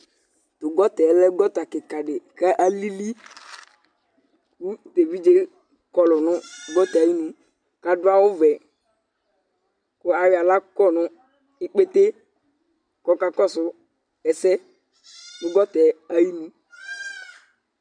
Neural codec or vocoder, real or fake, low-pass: none; real; 9.9 kHz